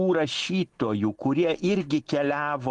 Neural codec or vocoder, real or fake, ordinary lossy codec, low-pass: none; real; Opus, 24 kbps; 10.8 kHz